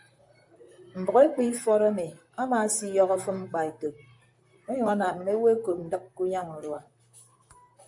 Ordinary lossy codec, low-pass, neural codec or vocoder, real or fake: MP3, 64 kbps; 10.8 kHz; vocoder, 44.1 kHz, 128 mel bands, Pupu-Vocoder; fake